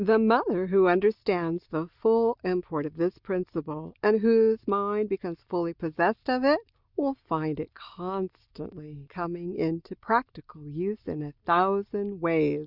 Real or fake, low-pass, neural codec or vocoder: real; 5.4 kHz; none